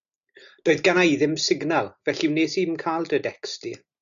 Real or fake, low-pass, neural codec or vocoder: real; 7.2 kHz; none